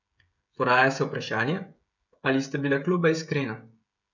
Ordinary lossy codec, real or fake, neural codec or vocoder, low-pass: none; fake; codec, 16 kHz, 16 kbps, FreqCodec, smaller model; 7.2 kHz